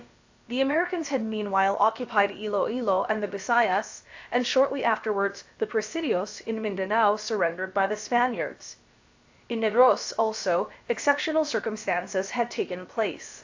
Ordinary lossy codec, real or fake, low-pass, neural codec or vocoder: AAC, 48 kbps; fake; 7.2 kHz; codec, 16 kHz, about 1 kbps, DyCAST, with the encoder's durations